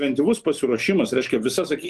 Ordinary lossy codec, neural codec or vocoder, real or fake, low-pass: Opus, 32 kbps; vocoder, 44.1 kHz, 128 mel bands every 256 samples, BigVGAN v2; fake; 14.4 kHz